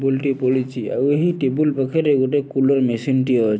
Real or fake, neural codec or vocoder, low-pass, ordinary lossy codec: real; none; none; none